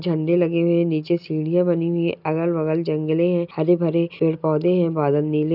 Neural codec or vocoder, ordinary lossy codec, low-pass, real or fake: none; none; 5.4 kHz; real